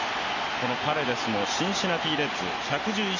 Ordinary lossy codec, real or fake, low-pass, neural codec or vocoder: none; real; 7.2 kHz; none